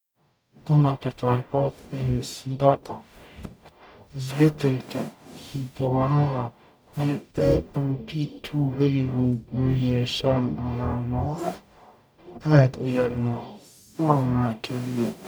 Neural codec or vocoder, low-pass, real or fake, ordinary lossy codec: codec, 44.1 kHz, 0.9 kbps, DAC; none; fake; none